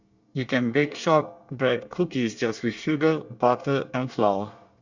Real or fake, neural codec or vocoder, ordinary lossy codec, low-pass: fake; codec, 24 kHz, 1 kbps, SNAC; Opus, 64 kbps; 7.2 kHz